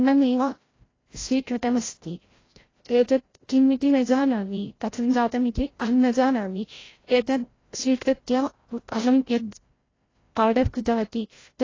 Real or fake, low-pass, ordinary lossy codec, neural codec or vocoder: fake; 7.2 kHz; AAC, 32 kbps; codec, 16 kHz, 0.5 kbps, FreqCodec, larger model